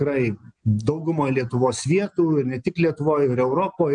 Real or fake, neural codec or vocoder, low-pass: real; none; 9.9 kHz